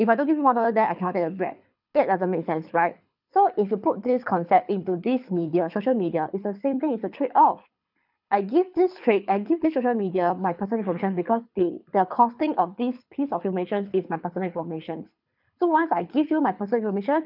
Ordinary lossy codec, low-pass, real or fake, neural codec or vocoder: none; 5.4 kHz; fake; codec, 24 kHz, 6 kbps, HILCodec